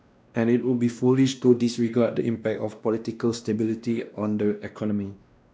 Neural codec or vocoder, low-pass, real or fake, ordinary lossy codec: codec, 16 kHz, 1 kbps, X-Codec, WavLM features, trained on Multilingual LibriSpeech; none; fake; none